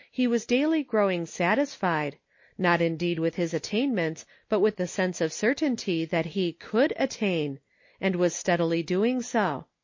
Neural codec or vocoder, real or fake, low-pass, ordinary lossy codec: none; real; 7.2 kHz; MP3, 32 kbps